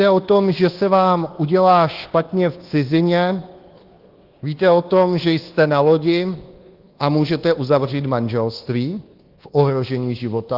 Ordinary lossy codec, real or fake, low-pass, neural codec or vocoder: Opus, 16 kbps; fake; 5.4 kHz; codec, 24 kHz, 1.2 kbps, DualCodec